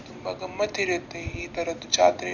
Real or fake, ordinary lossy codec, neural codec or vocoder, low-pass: real; none; none; 7.2 kHz